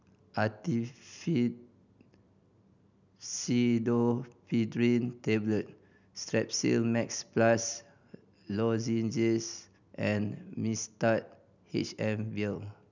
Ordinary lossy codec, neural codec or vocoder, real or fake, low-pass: none; none; real; 7.2 kHz